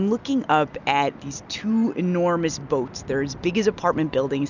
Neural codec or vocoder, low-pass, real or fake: none; 7.2 kHz; real